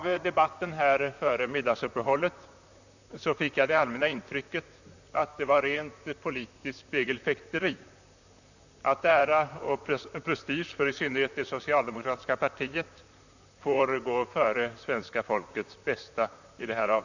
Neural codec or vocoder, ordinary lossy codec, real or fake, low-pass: vocoder, 44.1 kHz, 128 mel bands, Pupu-Vocoder; none; fake; 7.2 kHz